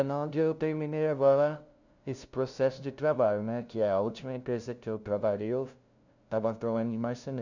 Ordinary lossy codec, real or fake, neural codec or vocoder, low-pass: none; fake; codec, 16 kHz, 0.5 kbps, FunCodec, trained on LibriTTS, 25 frames a second; 7.2 kHz